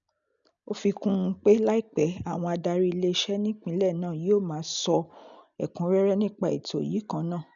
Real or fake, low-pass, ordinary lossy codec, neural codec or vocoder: real; 7.2 kHz; none; none